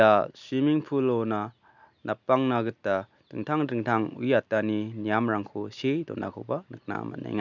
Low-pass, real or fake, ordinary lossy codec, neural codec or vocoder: 7.2 kHz; real; none; none